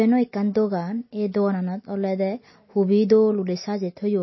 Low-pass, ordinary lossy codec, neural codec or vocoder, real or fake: 7.2 kHz; MP3, 24 kbps; none; real